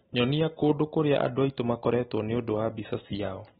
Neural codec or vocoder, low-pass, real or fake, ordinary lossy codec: none; 19.8 kHz; real; AAC, 16 kbps